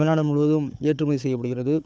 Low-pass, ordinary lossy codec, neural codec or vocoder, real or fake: none; none; codec, 16 kHz, 4 kbps, FunCodec, trained on Chinese and English, 50 frames a second; fake